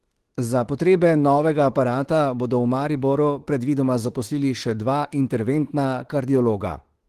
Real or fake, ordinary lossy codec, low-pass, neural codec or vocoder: fake; Opus, 16 kbps; 14.4 kHz; autoencoder, 48 kHz, 32 numbers a frame, DAC-VAE, trained on Japanese speech